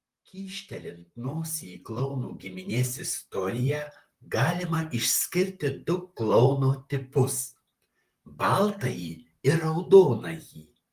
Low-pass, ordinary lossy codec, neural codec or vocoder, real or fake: 14.4 kHz; Opus, 32 kbps; vocoder, 44.1 kHz, 128 mel bands, Pupu-Vocoder; fake